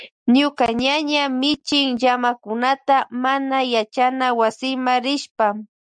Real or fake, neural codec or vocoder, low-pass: real; none; 9.9 kHz